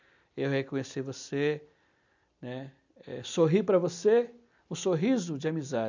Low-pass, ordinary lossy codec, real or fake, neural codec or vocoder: 7.2 kHz; none; real; none